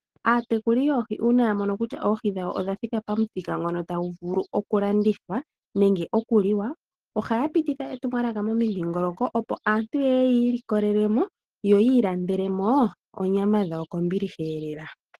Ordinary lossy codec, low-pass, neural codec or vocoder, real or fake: Opus, 16 kbps; 14.4 kHz; none; real